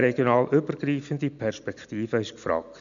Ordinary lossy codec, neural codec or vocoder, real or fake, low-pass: none; none; real; 7.2 kHz